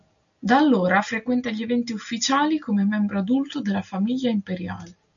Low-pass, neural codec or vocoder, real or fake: 7.2 kHz; none; real